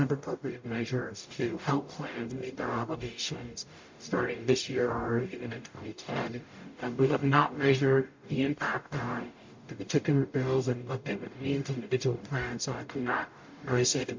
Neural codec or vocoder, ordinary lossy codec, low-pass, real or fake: codec, 44.1 kHz, 0.9 kbps, DAC; MP3, 48 kbps; 7.2 kHz; fake